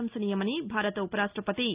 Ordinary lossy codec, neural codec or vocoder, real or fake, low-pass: Opus, 24 kbps; none; real; 3.6 kHz